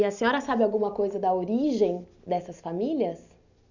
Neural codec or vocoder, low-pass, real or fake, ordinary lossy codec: none; 7.2 kHz; real; none